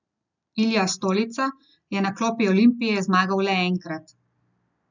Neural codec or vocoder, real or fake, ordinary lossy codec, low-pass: none; real; none; 7.2 kHz